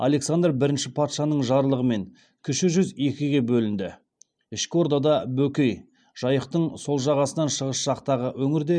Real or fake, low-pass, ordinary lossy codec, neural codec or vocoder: real; 9.9 kHz; none; none